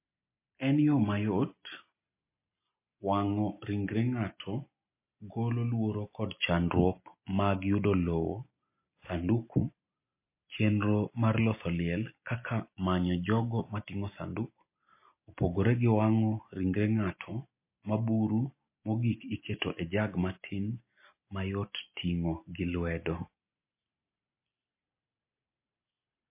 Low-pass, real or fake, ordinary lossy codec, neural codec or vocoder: 3.6 kHz; real; MP3, 24 kbps; none